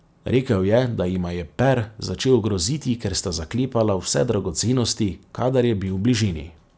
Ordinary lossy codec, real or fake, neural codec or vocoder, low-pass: none; real; none; none